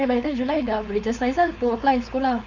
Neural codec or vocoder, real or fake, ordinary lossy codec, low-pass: codec, 16 kHz, 4.8 kbps, FACodec; fake; none; 7.2 kHz